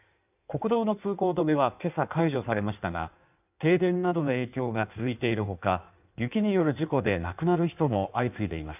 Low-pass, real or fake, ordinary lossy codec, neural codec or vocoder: 3.6 kHz; fake; none; codec, 16 kHz in and 24 kHz out, 1.1 kbps, FireRedTTS-2 codec